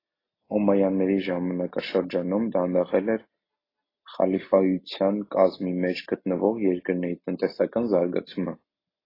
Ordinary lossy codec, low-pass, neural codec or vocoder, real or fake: AAC, 24 kbps; 5.4 kHz; none; real